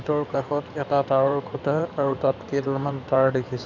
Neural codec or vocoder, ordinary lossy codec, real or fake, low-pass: codec, 16 kHz in and 24 kHz out, 2.2 kbps, FireRedTTS-2 codec; none; fake; 7.2 kHz